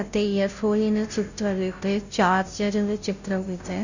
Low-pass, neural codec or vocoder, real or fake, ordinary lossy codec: 7.2 kHz; codec, 16 kHz, 0.5 kbps, FunCodec, trained on Chinese and English, 25 frames a second; fake; none